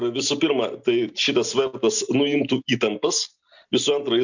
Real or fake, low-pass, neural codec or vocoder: real; 7.2 kHz; none